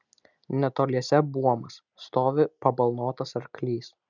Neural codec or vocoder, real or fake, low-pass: none; real; 7.2 kHz